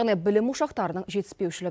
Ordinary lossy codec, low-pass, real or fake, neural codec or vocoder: none; none; real; none